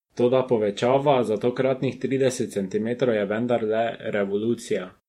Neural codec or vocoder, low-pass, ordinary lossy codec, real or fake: vocoder, 48 kHz, 128 mel bands, Vocos; 19.8 kHz; MP3, 64 kbps; fake